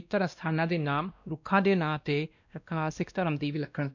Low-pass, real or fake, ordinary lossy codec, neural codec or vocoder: 7.2 kHz; fake; none; codec, 16 kHz, 1 kbps, X-Codec, WavLM features, trained on Multilingual LibriSpeech